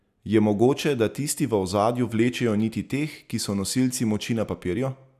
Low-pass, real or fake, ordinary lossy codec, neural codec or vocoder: 14.4 kHz; real; none; none